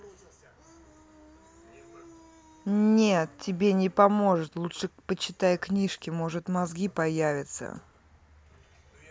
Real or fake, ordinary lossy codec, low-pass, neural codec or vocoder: real; none; none; none